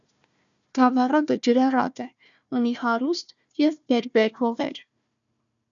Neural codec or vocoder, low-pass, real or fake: codec, 16 kHz, 1 kbps, FunCodec, trained on Chinese and English, 50 frames a second; 7.2 kHz; fake